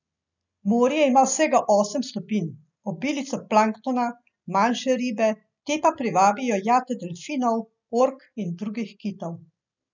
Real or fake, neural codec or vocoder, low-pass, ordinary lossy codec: real; none; 7.2 kHz; none